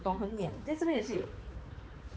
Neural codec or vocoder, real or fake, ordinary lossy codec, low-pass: codec, 16 kHz, 4 kbps, X-Codec, HuBERT features, trained on balanced general audio; fake; none; none